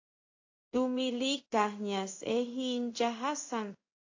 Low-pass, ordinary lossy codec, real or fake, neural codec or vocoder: 7.2 kHz; AAC, 48 kbps; fake; codec, 16 kHz in and 24 kHz out, 1 kbps, XY-Tokenizer